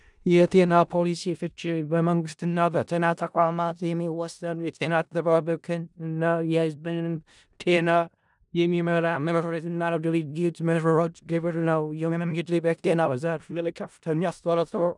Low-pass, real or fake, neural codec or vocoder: 10.8 kHz; fake; codec, 16 kHz in and 24 kHz out, 0.4 kbps, LongCat-Audio-Codec, four codebook decoder